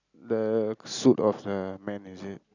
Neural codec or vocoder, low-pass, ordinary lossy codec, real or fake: none; 7.2 kHz; none; real